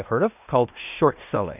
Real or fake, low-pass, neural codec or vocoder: fake; 3.6 kHz; codec, 16 kHz in and 24 kHz out, 0.9 kbps, LongCat-Audio-Codec, fine tuned four codebook decoder